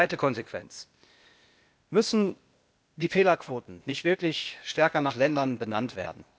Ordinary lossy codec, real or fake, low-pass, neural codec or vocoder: none; fake; none; codec, 16 kHz, 0.8 kbps, ZipCodec